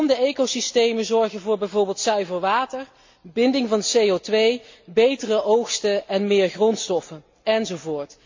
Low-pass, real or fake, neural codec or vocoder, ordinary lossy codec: 7.2 kHz; real; none; MP3, 48 kbps